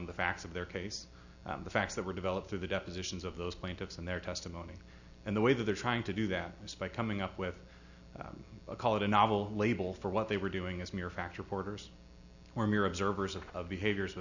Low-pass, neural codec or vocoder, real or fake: 7.2 kHz; none; real